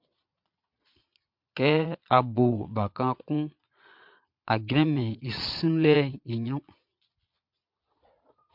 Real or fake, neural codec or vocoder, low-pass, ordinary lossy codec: fake; vocoder, 22.05 kHz, 80 mel bands, Vocos; 5.4 kHz; MP3, 48 kbps